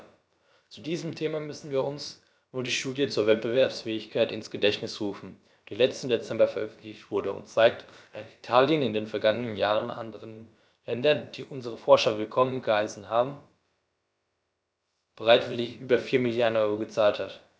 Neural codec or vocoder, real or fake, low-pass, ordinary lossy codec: codec, 16 kHz, about 1 kbps, DyCAST, with the encoder's durations; fake; none; none